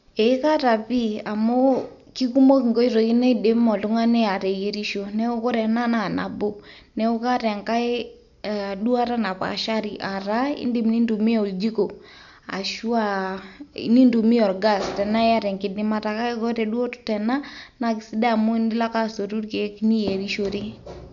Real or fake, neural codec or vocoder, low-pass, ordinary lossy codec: real; none; 7.2 kHz; none